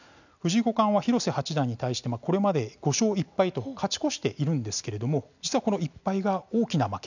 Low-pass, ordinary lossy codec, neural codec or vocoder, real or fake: 7.2 kHz; none; none; real